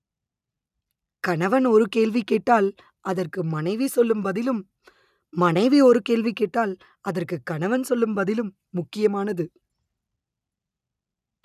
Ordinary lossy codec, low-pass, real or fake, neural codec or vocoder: none; 14.4 kHz; real; none